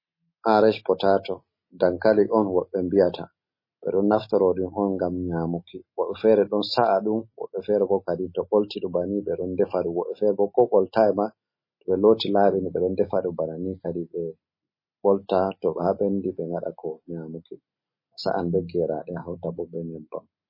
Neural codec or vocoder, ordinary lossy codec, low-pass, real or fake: none; MP3, 24 kbps; 5.4 kHz; real